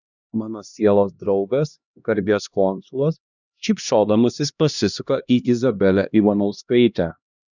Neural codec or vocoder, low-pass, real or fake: codec, 16 kHz, 1 kbps, X-Codec, HuBERT features, trained on LibriSpeech; 7.2 kHz; fake